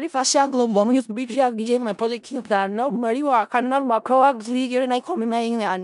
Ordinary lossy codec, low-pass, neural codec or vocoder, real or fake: none; 10.8 kHz; codec, 16 kHz in and 24 kHz out, 0.4 kbps, LongCat-Audio-Codec, four codebook decoder; fake